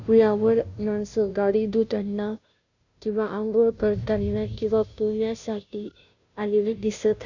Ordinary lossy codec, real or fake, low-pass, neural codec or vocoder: none; fake; 7.2 kHz; codec, 16 kHz, 0.5 kbps, FunCodec, trained on Chinese and English, 25 frames a second